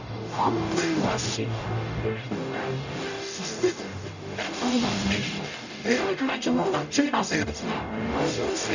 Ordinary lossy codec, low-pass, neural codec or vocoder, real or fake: none; 7.2 kHz; codec, 44.1 kHz, 0.9 kbps, DAC; fake